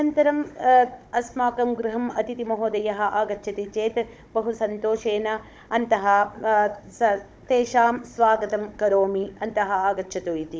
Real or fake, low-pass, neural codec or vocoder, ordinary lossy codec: fake; none; codec, 16 kHz, 16 kbps, FunCodec, trained on Chinese and English, 50 frames a second; none